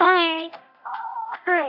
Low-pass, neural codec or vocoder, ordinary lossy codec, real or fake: 5.4 kHz; codec, 24 kHz, 0.9 kbps, DualCodec; AAC, 48 kbps; fake